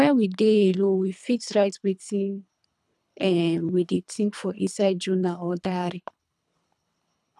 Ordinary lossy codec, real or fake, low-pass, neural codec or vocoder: none; fake; none; codec, 24 kHz, 3 kbps, HILCodec